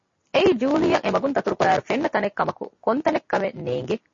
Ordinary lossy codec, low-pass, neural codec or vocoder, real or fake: MP3, 32 kbps; 7.2 kHz; none; real